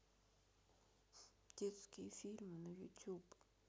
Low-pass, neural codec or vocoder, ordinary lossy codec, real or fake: none; none; none; real